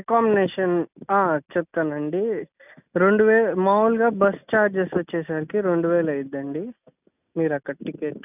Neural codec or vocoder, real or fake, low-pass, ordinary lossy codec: none; real; 3.6 kHz; none